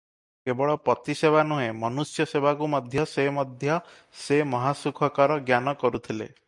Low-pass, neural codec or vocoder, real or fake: 10.8 kHz; none; real